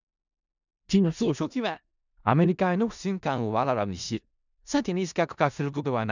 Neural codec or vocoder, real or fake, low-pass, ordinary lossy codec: codec, 16 kHz in and 24 kHz out, 0.4 kbps, LongCat-Audio-Codec, four codebook decoder; fake; 7.2 kHz; none